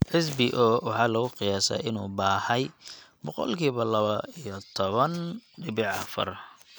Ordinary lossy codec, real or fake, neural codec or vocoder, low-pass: none; real; none; none